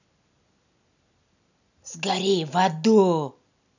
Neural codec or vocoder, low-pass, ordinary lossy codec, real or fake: none; 7.2 kHz; none; real